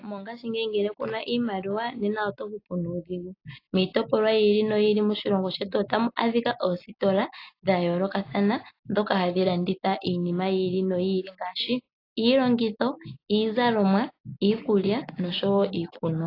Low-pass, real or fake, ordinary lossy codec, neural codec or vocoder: 5.4 kHz; real; AAC, 32 kbps; none